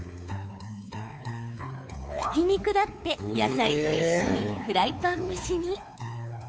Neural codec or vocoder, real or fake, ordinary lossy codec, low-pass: codec, 16 kHz, 4 kbps, X-Codec, WavLM features, trained on Multilingual LibriSpeech; fake; none; none